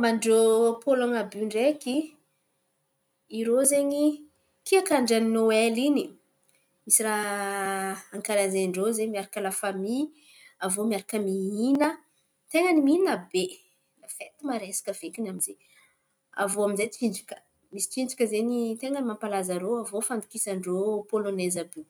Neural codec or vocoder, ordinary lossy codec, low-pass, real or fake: none; none; none; real